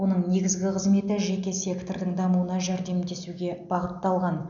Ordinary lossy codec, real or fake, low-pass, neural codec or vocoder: none; real; 7.2 kHz; none